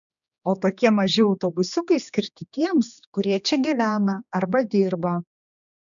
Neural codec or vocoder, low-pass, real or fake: codec, 16 kHz, 2 kbps, X-Codec, HuBERT features, trained on general audio; 7.2 kHz; fake